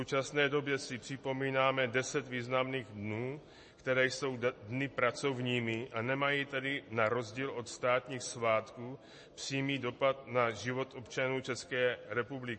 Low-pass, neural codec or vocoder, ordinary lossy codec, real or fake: 10.8 kHz; none; MP3, 32 kbps; real